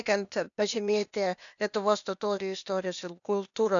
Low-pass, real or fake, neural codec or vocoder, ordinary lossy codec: 7.2 kHz; fake; codec, 16 kHz, 0.8 kbps, ZipCodec; MP3, 96 kbps